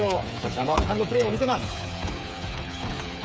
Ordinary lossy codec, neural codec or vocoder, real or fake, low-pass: none; codec, 16 kHz, 8 kbps, FreqCodec, smaller model; fake; none